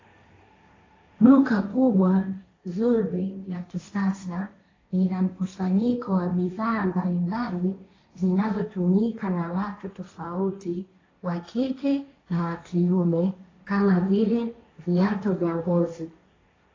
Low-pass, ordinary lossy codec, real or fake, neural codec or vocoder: 7.2 kHz; AAC, 32 kbps; fake; codec, 16 kHz, 1.1 kbps, Voila-Tokenizer